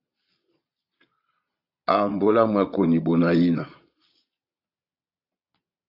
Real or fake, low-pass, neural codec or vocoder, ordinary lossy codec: fake; 5.4 kHz; vocoder, 22.05 kHz, 80 mel bands, Vocos; AAC, 32 kbps